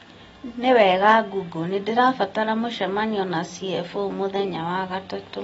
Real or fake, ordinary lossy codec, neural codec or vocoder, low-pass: real; AAC, 24 kbps; none; 19.8 kHz